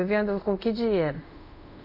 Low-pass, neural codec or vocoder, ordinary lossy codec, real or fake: 5.4 kHz; codec, 24 kHz, 0.5 kbps, DualCodec; none; fake